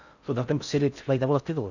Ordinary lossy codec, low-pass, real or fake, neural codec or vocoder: none; 7.2 kHz; fake; codec, 16 kHz in and 24 kHz out, 0.6 kbps, FocalCodec, streaming, 4096 codes